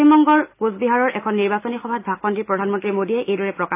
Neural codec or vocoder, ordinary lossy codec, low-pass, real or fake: none; AAC, 24 kbps; 3.6 kHz; real